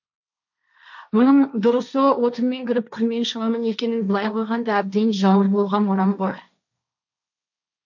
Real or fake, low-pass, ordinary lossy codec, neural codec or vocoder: fake; 7.2 kHz; none; codec, 16 kHz, 1.1 kbps, Voila-Tokenizer